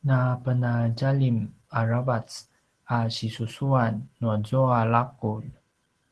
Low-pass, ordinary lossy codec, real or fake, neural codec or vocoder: 10.8 kHz; Opus, 16 kbps; real; none